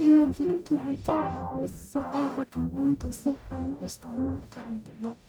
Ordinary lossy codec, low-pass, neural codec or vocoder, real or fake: none; none; codec, 44.1 kHz, 0.9 kbps, DAC; fake